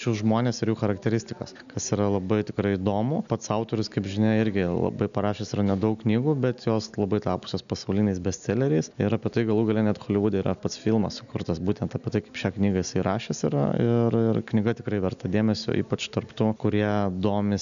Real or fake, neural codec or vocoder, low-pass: real; none; 7.2 kHz